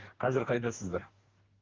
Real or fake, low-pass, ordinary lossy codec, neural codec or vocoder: fake; 7.2 kHz; Opus, 16 kbps; codec, 44.1 kHz, 2.6 kbps, DAC